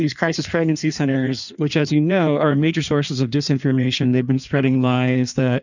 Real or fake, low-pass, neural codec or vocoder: fake; 7.2 kHz; codec, 16 kHz in and 24 kHz out, 1.1 kbps, FireRedTTS-2 codec